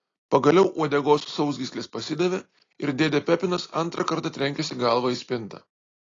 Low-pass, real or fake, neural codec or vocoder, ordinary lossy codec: 7.2 kHz; real; none; AAC, 32 kbps